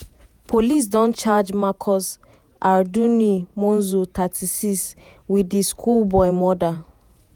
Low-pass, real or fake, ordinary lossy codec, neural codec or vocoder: none; fake; none; vocoder, 48 kHz, 128 mel bands, Vocos